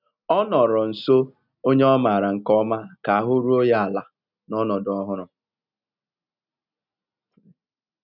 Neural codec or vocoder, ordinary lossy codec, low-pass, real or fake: none; none; 5.4 kHz; real